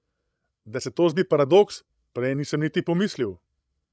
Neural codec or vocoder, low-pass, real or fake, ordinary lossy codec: codec, 16 kHz, 16 kbps, FreqCodec, larger model; none; fake; none